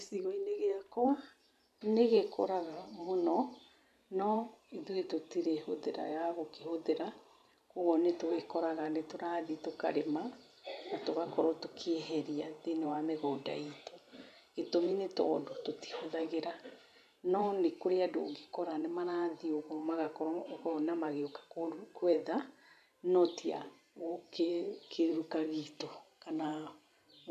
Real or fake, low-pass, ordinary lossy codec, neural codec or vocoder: fake; 14.4 kHz; none; vocoder, 44.1 kHz, 128 mel bands every 512 samples, BigVGAN v2